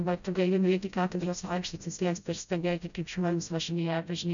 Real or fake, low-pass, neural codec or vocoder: fake; 7.2 kHz; codec, 16 kHz, 0.5 kbps, FreqCodec, smaller model